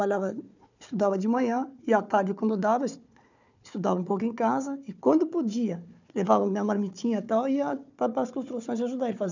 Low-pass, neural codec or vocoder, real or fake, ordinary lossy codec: 7.2 kHz; codec, 16 kHz, 4 kbps, FunCodec, trained on Chinese and English, 50 frames a second; fake; none